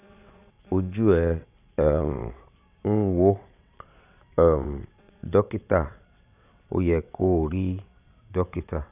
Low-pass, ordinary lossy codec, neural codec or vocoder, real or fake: 3.6 kHz; none; vocoder, 22.05 kHz, 80 mel bands, WaveNeXt; fake